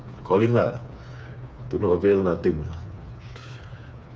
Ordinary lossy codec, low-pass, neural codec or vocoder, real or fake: none; none; codec, 16 kHz, 4 kbps, FreqCodec, smaller model; fake